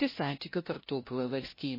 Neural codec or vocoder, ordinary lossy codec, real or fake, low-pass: codec, 16 kHz, 0.5 kbps, FunCodec, trained on LibriTTS, 25 frames a second; MP3, 24 kbps; fake; 5.4 kHz